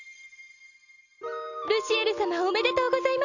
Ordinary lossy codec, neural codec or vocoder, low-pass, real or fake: none; none; 7.2 kHz; real